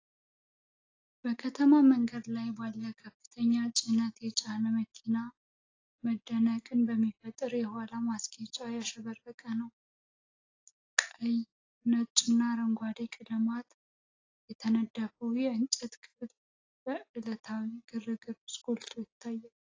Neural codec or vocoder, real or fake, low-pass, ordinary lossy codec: none; real; 7.2 kHz; AAC, 32 kbps